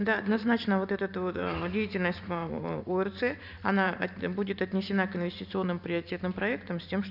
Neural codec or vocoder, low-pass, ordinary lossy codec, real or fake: vocoder, 44.1 kHz, 128 mel bands every 512 samples, BigVGAN v2; 5.4 kHz; none; fake